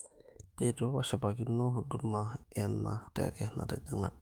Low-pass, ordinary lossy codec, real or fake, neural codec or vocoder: 19.8 kHz; Opus, 32 kbps; fake; autoencoder, 48 kHz, 32 numbers a frame, DAC-VAE, trained on Japanese speech